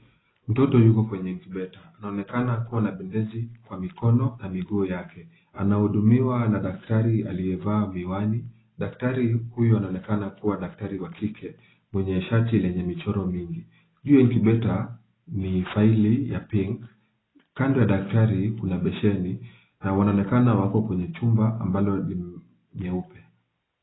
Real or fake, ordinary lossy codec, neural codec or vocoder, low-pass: real; AAC, 16 kbps; none; 7.2 kHz